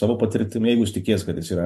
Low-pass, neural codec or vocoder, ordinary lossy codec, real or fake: 14.4 kHz; none; MP3, 64 kbps; real